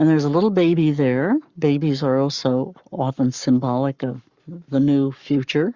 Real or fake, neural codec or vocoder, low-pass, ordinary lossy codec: fake; codec, 44.1 kHz, 7.8 kbps, Pupu-Codec; 7.2 kHz; Opus, 64 kbps